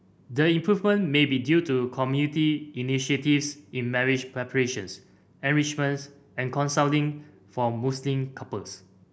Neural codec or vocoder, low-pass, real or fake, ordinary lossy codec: none; none; real; none